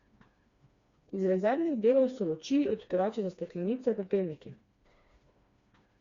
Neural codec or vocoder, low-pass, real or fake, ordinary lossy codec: codec, 16 kHz, 2 kbps, FreqCodec, smaller model; 7.2 kHz; fake; Opus, 64 kbps